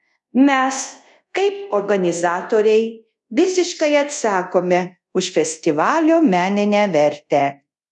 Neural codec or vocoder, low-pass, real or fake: codec, 24 kHz, 0.5 kbps, DualCodec; 10.8 kHz; fake